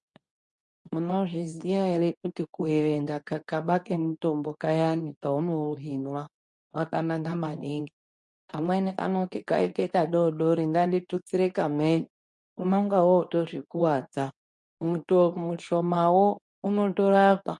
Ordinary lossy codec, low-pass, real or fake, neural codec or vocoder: MP3, 48 kbps; 10.8 kHz; fake; codec, 24 kHz, 0.9 kbps, WavTokenizer, medium speech release version 2